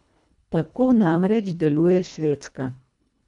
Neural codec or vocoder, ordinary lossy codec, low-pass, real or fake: codec, 24 kHz, 1.5 kbps, HILCodec; none; 10.8 kHz; fake